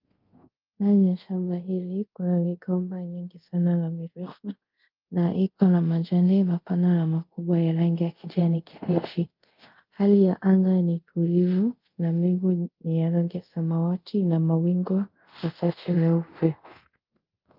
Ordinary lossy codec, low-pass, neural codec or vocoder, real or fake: Opus, 24 kbps; 5.4 kHz; codec, 24 kHz, 0.5 kbps, DualCodec; fake